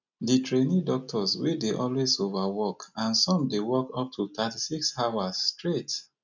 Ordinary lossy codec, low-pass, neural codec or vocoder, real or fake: none; 7.2 kHz; none; real